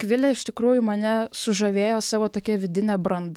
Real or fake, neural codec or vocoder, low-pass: fake; codec, 44.1 kHz, 7.8 kbps, DAC; 19.8 kHz